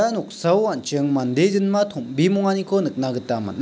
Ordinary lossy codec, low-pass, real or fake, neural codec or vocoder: none; none; real; none